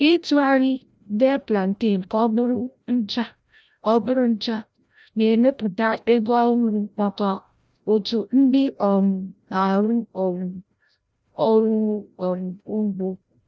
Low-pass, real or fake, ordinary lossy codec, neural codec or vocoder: none; fake; none; codec, 16 kHz, 0.5 kbps, FreqCodec, larger model